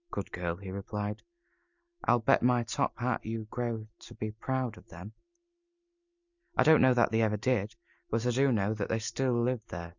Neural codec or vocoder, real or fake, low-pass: none; real; 7.2 kHz